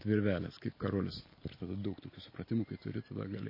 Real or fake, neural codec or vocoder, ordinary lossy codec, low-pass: real; none; MP3, 24 kbps; 5.4 kHz